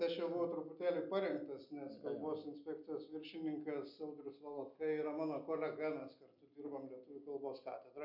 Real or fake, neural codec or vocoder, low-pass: real; none; 5.4 kHz